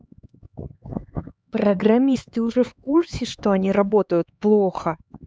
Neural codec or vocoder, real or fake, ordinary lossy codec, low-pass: codec, 16 kHz, 4 kbps, X-Codec, HuBERT features, trained on LibriSpeech; fake; none; none